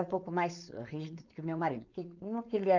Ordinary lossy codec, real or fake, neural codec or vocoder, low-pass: none; fake; codec, 16 kHz, 4.8 kbps, FACodec; 7.2 kHz